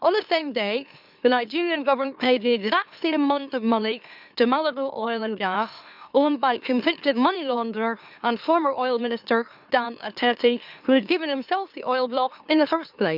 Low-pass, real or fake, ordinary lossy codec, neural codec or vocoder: 5.4 kHz; fake; none; autoencoder, 44.1 kHz, a latent of 192 numbers a frame, MeloTTS